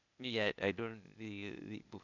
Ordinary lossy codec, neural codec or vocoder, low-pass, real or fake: none; codec, 16 kHz, 0.8 kbps, ZipCodec; 7.2 kHz; fake